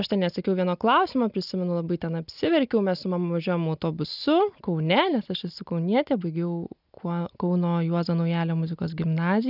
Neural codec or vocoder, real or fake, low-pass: none; real; 5.4 kHz